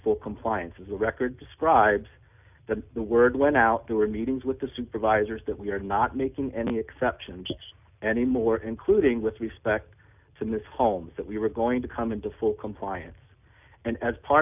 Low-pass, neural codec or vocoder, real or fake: 3.6 kHz; none; real